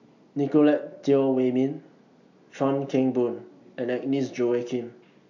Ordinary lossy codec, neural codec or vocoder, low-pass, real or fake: none; none; 7.2 kHz; real